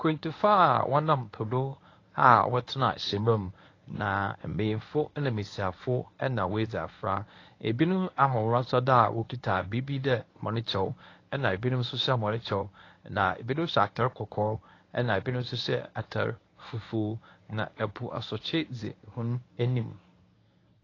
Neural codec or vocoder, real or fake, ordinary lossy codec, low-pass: codec, 24 kHz, 0.9 kbps, WavTokenizer, medium speech release version 1; fake; AAC, 32 kbps; 7.2 kHz